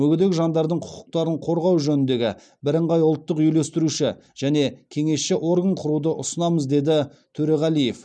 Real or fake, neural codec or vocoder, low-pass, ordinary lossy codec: real; none; 9.9 kHz; none